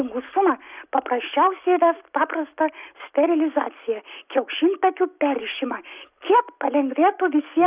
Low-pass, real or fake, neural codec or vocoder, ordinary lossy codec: 3.6 kHz; real; none; Opus, 64 kbps